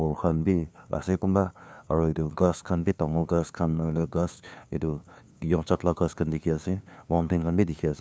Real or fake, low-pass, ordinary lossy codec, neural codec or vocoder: fake; none; none; codec, 16 kHz, 2 kbps, FunCodec, trained on LibriTTS, 25 frames a second